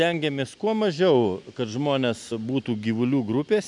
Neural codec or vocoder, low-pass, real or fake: none; 10.8 kHz; real